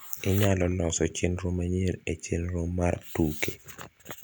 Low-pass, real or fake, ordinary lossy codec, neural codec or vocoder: none; real; none; none